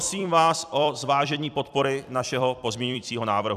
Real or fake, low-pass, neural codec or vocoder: fake; 14.4 kHz; vocoder, 44.1 kHz, 128 mel bands every 256 samples, BigVGAN v2